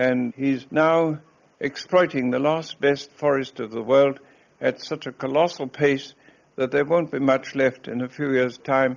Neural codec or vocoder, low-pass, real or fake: none; 7.2 kHz; real